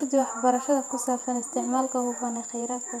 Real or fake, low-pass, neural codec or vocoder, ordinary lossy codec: fake; 19.8 kHz; vocoder, 48 kHz, 128 mel bands, Vocos; none